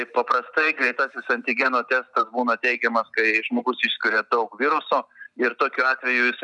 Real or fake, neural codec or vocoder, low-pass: real; none; 10.8 kHz